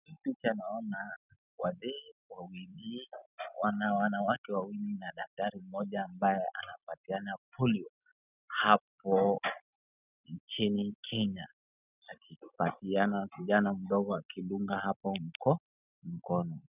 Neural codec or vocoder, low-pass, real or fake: none; 3.6 kHz; real